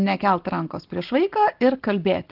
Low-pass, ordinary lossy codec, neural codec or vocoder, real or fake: 5.4 kHz; Opus, 16 kbps; none; real